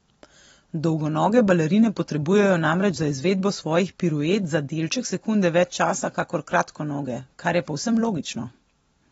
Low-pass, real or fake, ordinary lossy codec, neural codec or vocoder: 19.8 kHz; real; AAC, 24 kbps; none